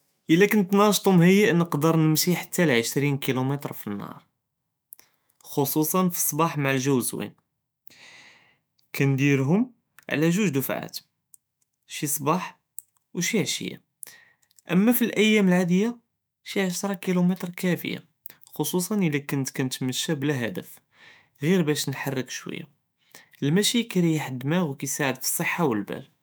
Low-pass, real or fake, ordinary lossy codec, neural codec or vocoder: none; fake; none; autoencoder, 48 kHz, 128 numbers a frame, DAC-VAE, trained on Japanese speech